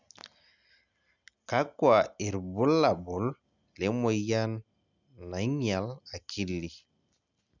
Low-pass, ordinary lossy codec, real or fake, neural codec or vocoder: 7.2 kHz; none; real; none